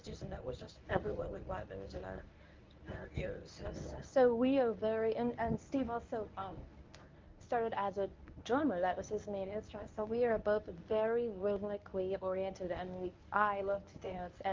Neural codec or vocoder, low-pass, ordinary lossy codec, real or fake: codec, 24 kHz, 0.9 kbps, WavTokenizer, medium speech release version 1; 7.2 kHz; Opus, 24 kbps; fake